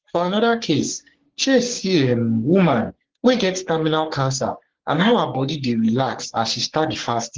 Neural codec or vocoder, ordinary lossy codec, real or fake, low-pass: codec, 44.1 kHz, 3.4 kbps, Pupu-Codec; Opus, 16 kbps; fake; 7.2 kHz